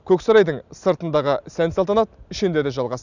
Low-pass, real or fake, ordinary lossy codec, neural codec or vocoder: 7.2 kHz; real; none; none